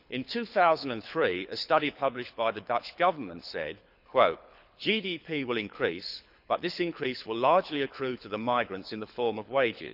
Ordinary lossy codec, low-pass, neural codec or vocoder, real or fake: none; 5.4 kHz; codec, 24 kHz, 6 kbps, HILCodec; fake